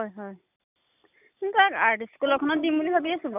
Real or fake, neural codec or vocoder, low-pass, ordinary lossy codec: real; none; 3.6 kHz; none